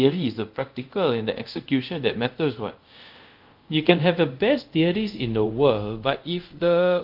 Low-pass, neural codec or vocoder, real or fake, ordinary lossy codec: 5.4 kHz; codec, 24 kHz, 0.5 kbps, DualCodec; fake; Opus, 32 kbps